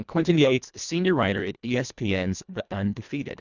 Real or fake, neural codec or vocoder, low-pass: fake; codec, 24 kHz, 1.5 kbps, HILCodec; 7.2 kHz